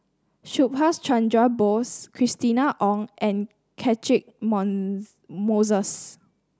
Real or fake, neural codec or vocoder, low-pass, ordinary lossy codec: real; none; none; none